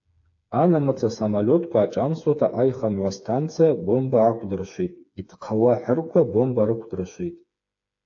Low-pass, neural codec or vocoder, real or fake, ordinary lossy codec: 7.2 kHz; codec, 16 kHz, 4 kbps, FreqCodec, smaller model; fake; AAC, 48 kbps